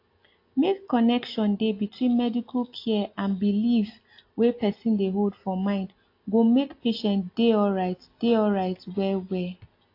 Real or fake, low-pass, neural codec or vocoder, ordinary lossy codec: real; 5.4 kHz; none; AAC, 32 kbps